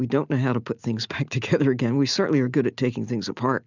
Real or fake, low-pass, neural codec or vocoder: real; 7.2 kHz; none